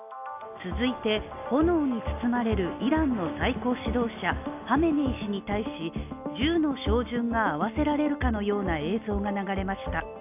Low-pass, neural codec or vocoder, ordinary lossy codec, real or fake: 3.6 kHz; none; AAC, 32 kbps; real